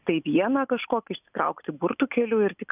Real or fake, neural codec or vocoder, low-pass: real; none; 3.6 kHz